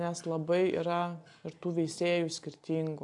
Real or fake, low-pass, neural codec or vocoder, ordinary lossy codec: real; 10.8 kHz; none; AAC, 64 kbps